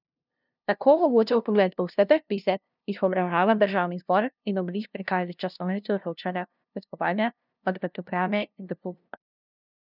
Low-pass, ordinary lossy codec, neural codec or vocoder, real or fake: 5.4 kHz; none; codec, 16 kHz, 0.5 kbps, FunCodec, trained on LibriTTS, 25 frames a second; fake